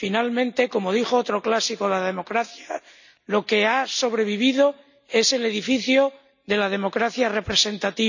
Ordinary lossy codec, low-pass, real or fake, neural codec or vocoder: none; 7.2 kHz; real; none